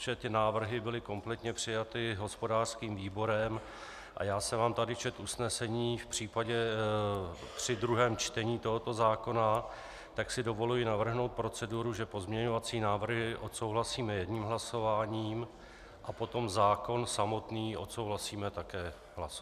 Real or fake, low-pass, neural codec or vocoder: real; 14.4 kHz; none